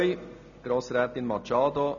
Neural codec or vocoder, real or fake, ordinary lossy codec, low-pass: none; real; none; 7.2 kHz